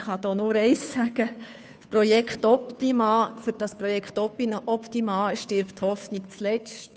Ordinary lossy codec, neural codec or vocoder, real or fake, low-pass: none; codec, 16 kHz, 2 kbps, FunCodec, trained on Chinese and English, 25 frames a second; fake; none